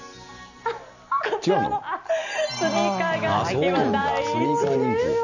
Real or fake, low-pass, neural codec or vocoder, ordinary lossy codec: real; 7.2 kHz; none; none